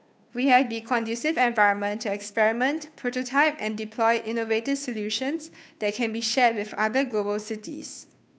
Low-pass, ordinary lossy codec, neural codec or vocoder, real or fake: none; none; codec, 16 kHz, 2 kbps, FunCodec, trained on Chinese and English, 25 frames a second; fake